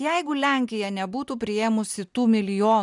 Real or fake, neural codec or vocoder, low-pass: real; none; 10.8 kHz